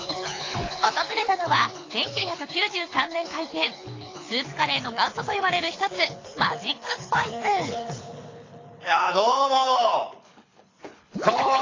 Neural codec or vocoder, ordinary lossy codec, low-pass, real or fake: codec, 24 kHz, 6 kbps, HILCodec; AAC, 32 kbps; 7.2 kHz; fake